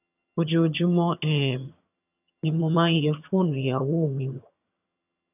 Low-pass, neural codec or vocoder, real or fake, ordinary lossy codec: 3.6 kHz; vocoder, 22.05 kHz, 80 mel bands, HiFi-GAN; fake; none